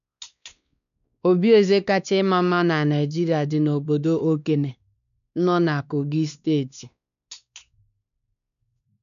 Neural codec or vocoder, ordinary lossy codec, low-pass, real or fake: codec, 16 kHz, 2 kbps, X-Codec, WavLM features, trained on Multilingual LibriSpeech; none; 7.2 kHz; fake